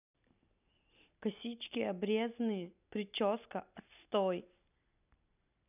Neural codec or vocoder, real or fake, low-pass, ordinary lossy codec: vocoder, 44.1 kHz, 128 mel bands every 256 samples, BigVGAN v2; fake; 3.6 kHz; none